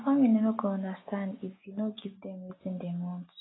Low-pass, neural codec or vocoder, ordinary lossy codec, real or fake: 7.2 kHz; none; AAC, 16 kbps; real